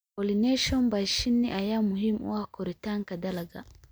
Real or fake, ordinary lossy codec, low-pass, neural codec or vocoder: real; none; none; none